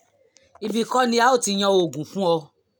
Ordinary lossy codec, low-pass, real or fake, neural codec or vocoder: none; none; real; none